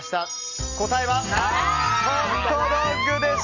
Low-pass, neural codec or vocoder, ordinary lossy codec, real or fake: 7.2 kHz; none; none; real